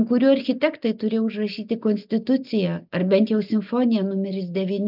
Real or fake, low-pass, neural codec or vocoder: real; 5.4 kHz; none